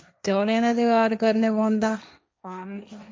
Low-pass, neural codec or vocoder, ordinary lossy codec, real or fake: none; codec, 16 kHz, 1.1 kbps, Voila-Tokenizer; none; fake